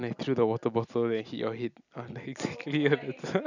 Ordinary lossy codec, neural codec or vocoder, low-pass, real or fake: none; none; 7.2 kHz; real